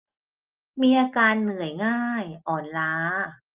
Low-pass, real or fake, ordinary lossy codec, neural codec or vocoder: 3.6 kHz; real; Opus, 32 kbps; none